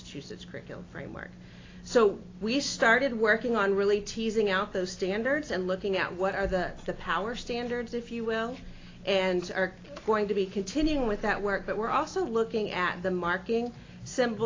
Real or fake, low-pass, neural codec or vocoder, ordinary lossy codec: real; 7.2 kHz; none; AAC, 32 kbps